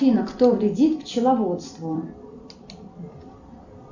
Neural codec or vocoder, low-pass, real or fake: vocoder, 44.1 kHz, 128 mel bands every 256 samples, BigVGAN v2; 7.2 kHz; fake